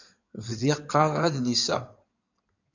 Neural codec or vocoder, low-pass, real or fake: codec, 16 kHz in and 24 kHz out, 2.2 kbps, FireRedTTS-2 codec; 7.2 kHz; fake